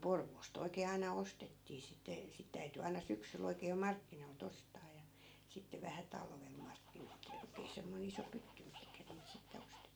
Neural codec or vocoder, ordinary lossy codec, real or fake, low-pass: none; none; real; none